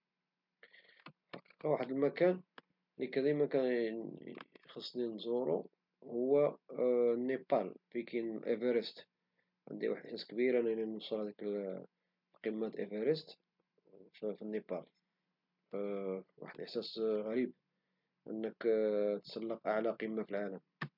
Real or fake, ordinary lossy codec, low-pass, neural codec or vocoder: real; MP3, 48 kbps; 5.4 kHz; none